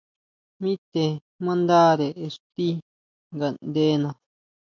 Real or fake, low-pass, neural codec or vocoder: real; 7.2 kHz; none